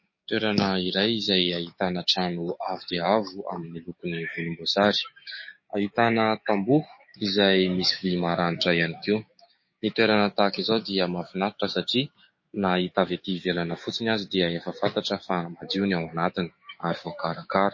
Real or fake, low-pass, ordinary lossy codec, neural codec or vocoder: fake; 7.2 kHz; MP3, 32 kbps; codec, 16 kHz, 6 kbps, DAC